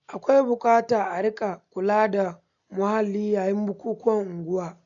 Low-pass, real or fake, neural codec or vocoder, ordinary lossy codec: 7.2 kHz; real; none; none